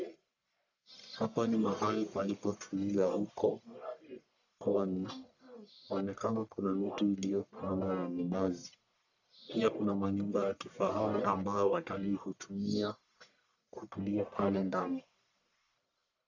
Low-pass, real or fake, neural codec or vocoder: 7.2 kHz; fake; codec, 44.1 kHz, 1.7 kbps, Pupu-Codec